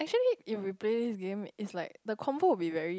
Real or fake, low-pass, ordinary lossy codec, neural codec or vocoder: real; none; none; none